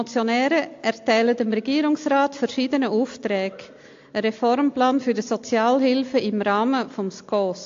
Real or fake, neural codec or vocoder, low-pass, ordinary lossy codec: real; none; 7.2 kHz; AAC, 48 kbps